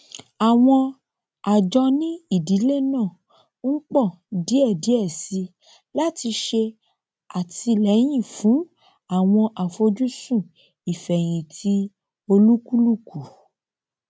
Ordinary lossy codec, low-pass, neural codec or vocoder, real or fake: none; none; none; real